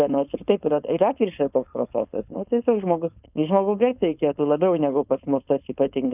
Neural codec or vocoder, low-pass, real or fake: codec, 16 kHz, 4.8 kbps, FACodec; 3.6 kHz; fake